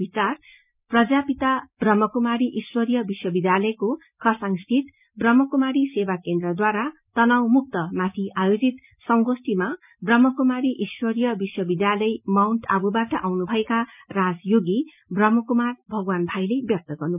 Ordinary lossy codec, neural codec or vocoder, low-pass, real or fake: none; none; 3.6 kHz; real